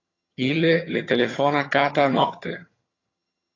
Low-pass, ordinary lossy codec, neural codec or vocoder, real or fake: 7.2 kHz; AAC, 32 kbps; vocoder, 22.05 kHz, 80 mel bands, HiFi-GAN; fake